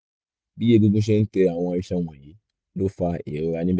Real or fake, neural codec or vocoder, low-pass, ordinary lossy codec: real; none; none; none